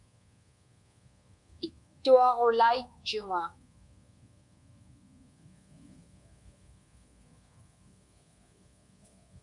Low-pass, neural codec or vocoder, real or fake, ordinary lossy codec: 10.8 kHz; codec, 24 kHz, 1.2 kbps, DualCodec; fake; MP3, 64 kbps